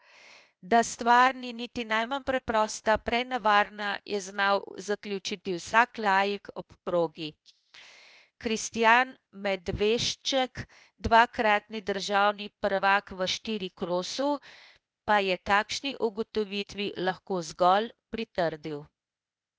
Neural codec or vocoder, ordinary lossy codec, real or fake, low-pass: codec, 16 kHz, 0.8 kbps, ZipCodec; none; fake; none